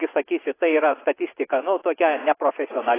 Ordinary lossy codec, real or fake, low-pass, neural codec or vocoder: AAC, 16 kbps; fake; 3.6 kHz; vocoder, 24 kHz, 100 mel bands, Vocos